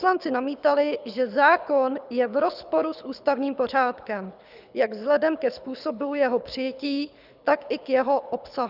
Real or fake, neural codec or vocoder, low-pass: fake; codec, 24 kHz, 6 kbps, HILCodec; 5.4 kHz